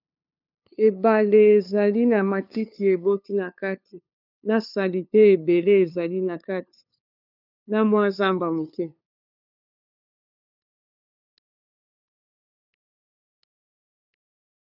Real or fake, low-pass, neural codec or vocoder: fake; 5.4 kHz; codec, 16 kHz, 2 kbps, FunCodec, trained on LibriTTS, 25 frames a second